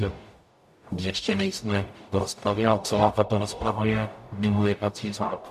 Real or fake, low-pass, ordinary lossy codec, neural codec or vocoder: fake; 14.4 kHz; MP3, 96 kbps; codec, 44.1 kHz, 0.9 kbps, DAC